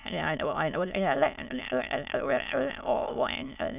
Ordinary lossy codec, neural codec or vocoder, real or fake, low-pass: none; autoencoder, 22.05 kHz, a latent of 192 numbers a frame, VITS, trained on many speakers; fake; 3.6 kHz